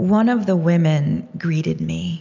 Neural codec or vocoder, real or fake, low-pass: none; real; 7.2 kHz